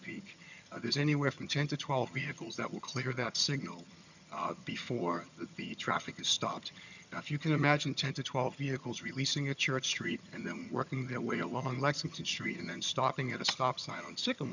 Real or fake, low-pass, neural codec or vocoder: fake; 7.2 kHz; vocoder, 22.05 kHz, 80 mel bands, HiFi-GAN